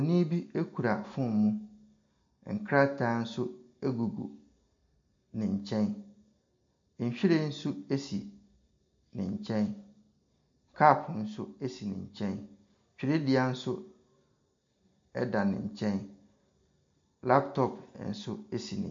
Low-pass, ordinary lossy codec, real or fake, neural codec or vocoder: 7.2 kHz; MP3, 48 kbps; real; none